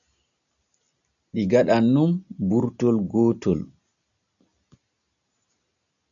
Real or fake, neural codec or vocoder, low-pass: real; none; 7.2 kHz